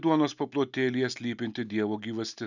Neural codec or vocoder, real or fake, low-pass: none; real; 7.2 kHz